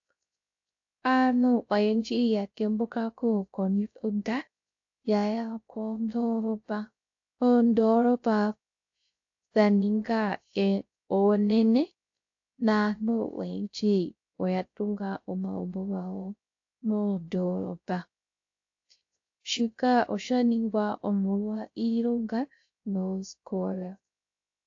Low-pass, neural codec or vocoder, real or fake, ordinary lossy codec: 7.2 kHz; codec, 16 kHz, 0.3 kbps, FocalCodec; fake; AAC, 48 kbps